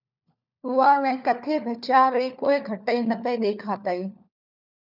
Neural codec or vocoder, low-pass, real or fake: codec, 16 kHz, 4 kbps, FunCodec, trained on LibriTTS, 50 frames a second; 5.4 kHz; fake